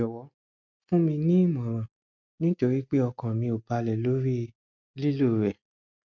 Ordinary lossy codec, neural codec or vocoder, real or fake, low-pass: none; none; real; 7.2 kHz